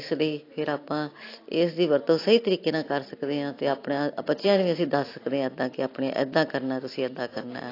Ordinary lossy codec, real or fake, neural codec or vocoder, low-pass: AAC, 32 kbps; real; none; 5.4 kHz